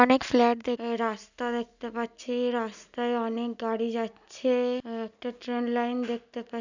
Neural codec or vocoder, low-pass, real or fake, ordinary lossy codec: none; 7.2 kHz; real; none